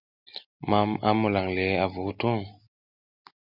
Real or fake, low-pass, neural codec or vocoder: real; 5.4 kHz; none